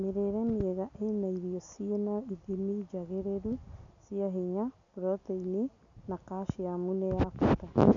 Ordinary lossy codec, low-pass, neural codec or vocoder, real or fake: none; 7.2 kHz; none; real